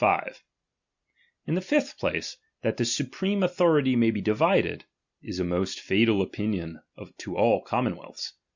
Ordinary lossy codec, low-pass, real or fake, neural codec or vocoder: Opus, 64 kbps; 7.2 kHz; real; none